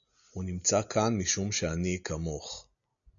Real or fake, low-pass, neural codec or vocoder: real; 7.2 kHz; none